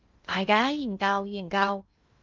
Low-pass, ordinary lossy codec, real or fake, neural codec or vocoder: 7.2 kHz; Opus, 24 kbps; fake; codec, 16 kHz in and 24 kHz out, 0.6 kbps, FocalCodec, streaming, 2048 codes